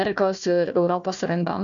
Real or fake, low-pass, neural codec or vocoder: fake; 7.2 kHz; codec, 16 kHz, 1 kbps, FunCodec, trained on Chinese and English, 50 frames a second